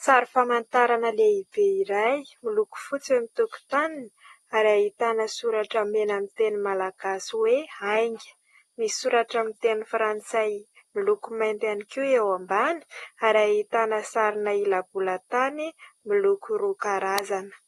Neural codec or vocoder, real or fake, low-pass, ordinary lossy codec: none; real; 19.8 kHz; AAC, 32 kbps